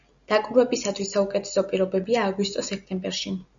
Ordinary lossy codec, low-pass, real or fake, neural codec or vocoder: MP3, 96 kbps; 7.2 kHz; real; none